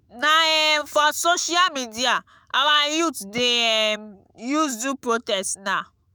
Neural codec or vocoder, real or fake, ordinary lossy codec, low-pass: autoencoder, 48 kHz, 128 numbers a frame, DAC-VAE, trained on Japanese speech; fake; none; none